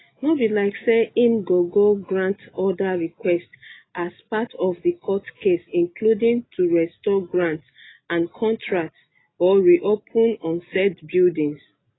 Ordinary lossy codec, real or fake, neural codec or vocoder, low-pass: AAC, 16 kbps; real; none; 7.2 kHz